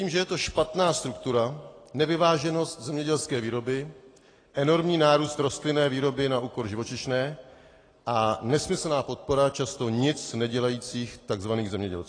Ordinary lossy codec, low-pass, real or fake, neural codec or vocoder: AAC, 32 kbps; 9.9 kHz; real; none